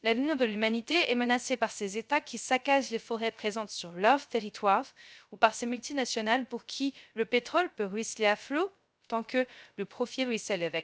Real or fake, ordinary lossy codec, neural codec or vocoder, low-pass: fake; none; codec, 16 kHz, 0.3 kbps, FocalCodec; none